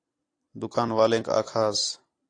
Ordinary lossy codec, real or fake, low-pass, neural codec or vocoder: AAC, 48 kbps; fake; 9.9 kHz; vocoder, 44.1 kHz, 128 mel bands every 256 samples, BigVGAN v2